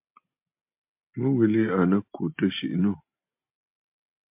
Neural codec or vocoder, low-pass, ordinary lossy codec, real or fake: none; 3.6 kHz; MP3, 32 kbps; real